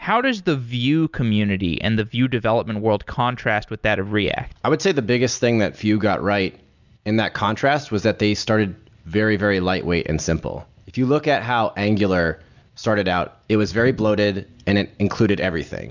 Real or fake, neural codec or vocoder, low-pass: real; none; 7.2 kHz